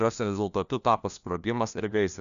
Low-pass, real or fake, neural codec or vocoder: 7.2 kHz; fake; codec, 16 kHz, 1 kbps, FunCodec, trained on LibriTTS, 50 frames a second